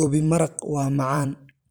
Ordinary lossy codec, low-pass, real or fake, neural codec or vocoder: none; none; fake; vocoder, 44.1 kHz, 128 mel bands every 512 samples, BigVGAN v2